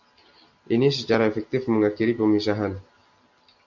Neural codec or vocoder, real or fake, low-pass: none; real; 7.2 kHz